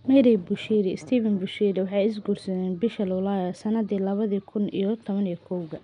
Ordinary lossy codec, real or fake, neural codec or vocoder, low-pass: none; real; none; 9.9 kHz